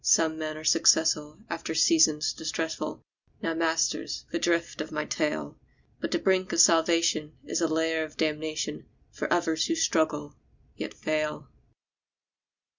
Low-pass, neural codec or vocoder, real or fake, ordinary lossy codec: 7.2 kHz; none; real; Opus, 64 kbps